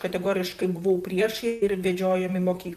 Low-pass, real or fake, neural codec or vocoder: 14.4 kHz; fake; vocoder, 44.1 kHz, 128 mel bands, Pupu-Vocoder